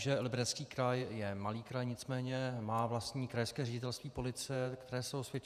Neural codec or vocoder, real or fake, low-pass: vocoder, 48 kHz, 128 mel bands, Vocos; fake; 14.4 kHz